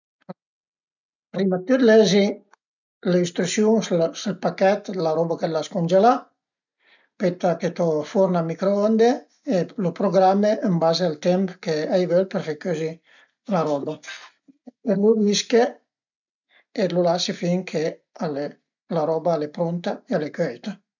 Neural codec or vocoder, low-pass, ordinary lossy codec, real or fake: none; 7.2 kHz; none; real